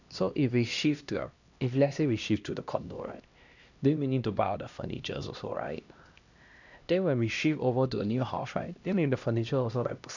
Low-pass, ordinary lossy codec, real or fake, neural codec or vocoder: 7.2 kHz; none; fake; codec, 16 kHz, 1 kbps, X-Codec, HuBERT features, trained on LibriSpeech